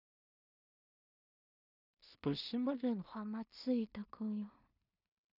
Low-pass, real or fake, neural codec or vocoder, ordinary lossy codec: 5.4 kHz; fake; codec, 16 kHz in and 24 kHz out, 0.4 kbps, LongCat-Audio-Codec, two codebook decoder; none